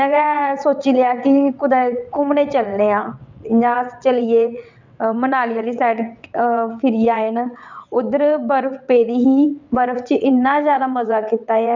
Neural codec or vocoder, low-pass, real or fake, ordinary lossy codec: vocoder, 22.05 kHz, 80 mel bands, WaveNeXt; 7.2 kHz; fake; none